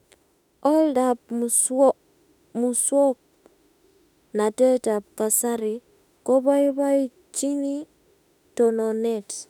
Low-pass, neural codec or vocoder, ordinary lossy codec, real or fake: 19.8 kHz; autoencoder, 48 kHz, 32 numbers a frame, DAC-VAE, trained on Japanese speech; none; fake